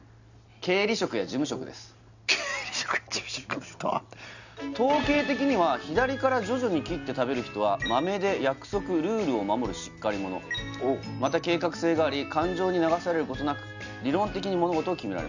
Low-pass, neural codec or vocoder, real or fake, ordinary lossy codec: 7.2 kHz; none; real; none